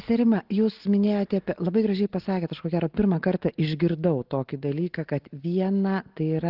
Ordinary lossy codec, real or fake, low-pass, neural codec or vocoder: Opus, 16 kbps; real; 5.4 kHz; none